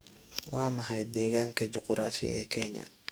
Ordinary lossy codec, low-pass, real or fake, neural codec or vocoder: none; none; fake; codec, 44.1 kHz, 2.6 kbps, DAC